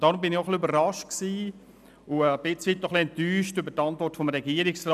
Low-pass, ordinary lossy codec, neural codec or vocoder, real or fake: 14.4 kHz; none; none; real